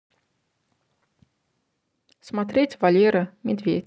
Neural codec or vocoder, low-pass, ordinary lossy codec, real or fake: none; none; none; real